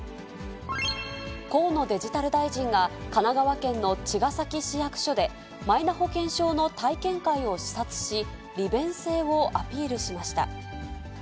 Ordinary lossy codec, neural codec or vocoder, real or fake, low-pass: none; none; real; none